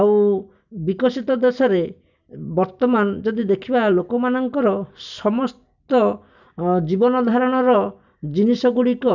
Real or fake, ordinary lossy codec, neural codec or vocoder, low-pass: real; none; none; 7.2 kHz